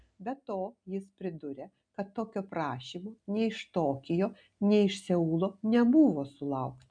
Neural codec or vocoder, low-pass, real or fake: none; 9.9 kHz; real